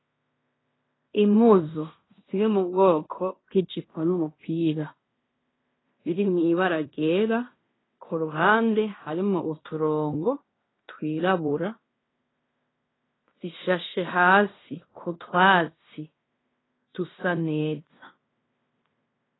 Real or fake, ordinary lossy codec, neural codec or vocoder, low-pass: fake; AAC, 16 kbps; codec, 16 kHz in and 24 kHz out, 0.9 kbps, LongCat-Audio-Codec, fine tuned four codebook decoder; 7.2 kHz